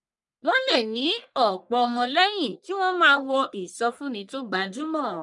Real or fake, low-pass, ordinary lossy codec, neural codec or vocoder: fake; 10.8 kHz; none; codec, 44.1 kHz, 1.7 kbps, Pupu-Codec